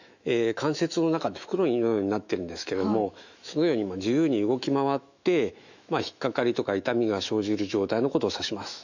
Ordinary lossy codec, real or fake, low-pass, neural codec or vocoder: none; fake; 7.2 kHz; autoencoder, 48 kHz, 128 numbers a frame, DAC-VAE, trained on Japanese speech